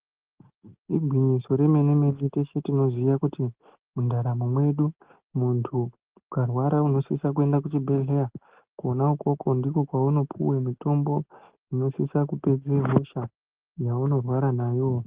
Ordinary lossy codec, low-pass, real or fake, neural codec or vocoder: Opus, 16 kbps; 3.6 kHz; real; none